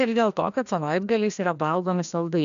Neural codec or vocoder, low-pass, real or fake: codec, 16 kHz, 1 kbps, FreqCodec, larger model; 7.2 kHz; fake